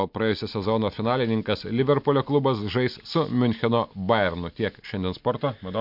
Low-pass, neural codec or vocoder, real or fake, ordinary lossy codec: 5.4 kHz; none; real; MP3, 48 kbps